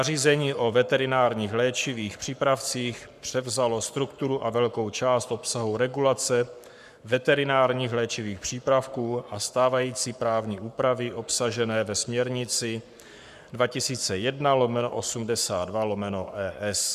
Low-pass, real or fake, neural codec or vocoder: 14.4 kHz; fake; codec, 44.1 kHz, 7.8 kbps, Pupu-Codec